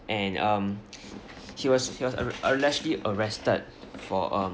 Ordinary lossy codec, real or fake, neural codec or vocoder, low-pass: none; real; none; none